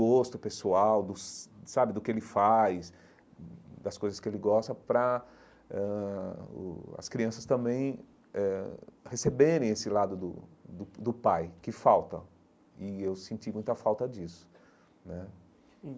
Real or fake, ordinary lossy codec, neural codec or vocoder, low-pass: real; none; none; none